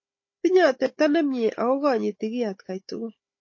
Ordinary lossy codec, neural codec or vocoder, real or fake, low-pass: MP3, 32 kbps; codec, 16 kHz, 16 kbps, FunCodec, trained on Chinese and English, 50 frames a second; fake; 7.2 kHz